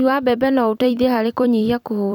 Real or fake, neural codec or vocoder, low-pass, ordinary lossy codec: fake; vocoder, 44.1 kHz, 128 mel bands every 256 samples, BigVGAN v2; 19.8 kHz; none